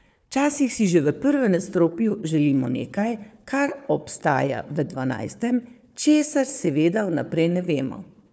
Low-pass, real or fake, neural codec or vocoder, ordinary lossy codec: none; fake; codec, 16 kHz, 4 kbps, FunCodec, trained on Chinese and English, 50 frames a second; none